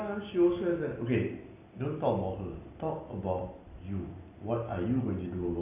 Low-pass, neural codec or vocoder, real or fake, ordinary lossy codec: 3.6 kHz; none; real; none